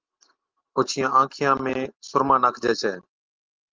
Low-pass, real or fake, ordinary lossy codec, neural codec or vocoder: 7.2 kHz; real; Opus, 32 kbps; none